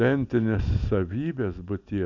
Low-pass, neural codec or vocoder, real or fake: 7.2 kHz; none; real